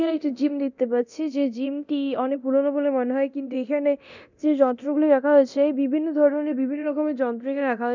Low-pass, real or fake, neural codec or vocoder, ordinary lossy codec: 7.2 kHz; fake; codec, 24 kHz, 0.9 kbps, DualCodec; none